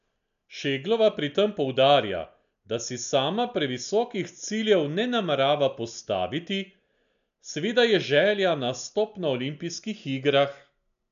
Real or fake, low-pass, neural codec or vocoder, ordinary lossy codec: real; 7.2 kHz; none; none